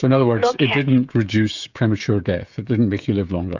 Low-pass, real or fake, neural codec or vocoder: 7.2 kHz; fake; codec, 16 kHz, 16 kbps, FreqCodec, smaller model